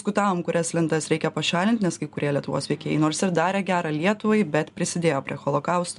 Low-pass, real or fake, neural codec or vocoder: 10.8 kHz; real; none